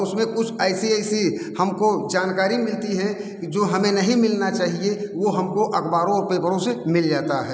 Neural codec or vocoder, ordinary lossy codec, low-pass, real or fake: none; none; none; real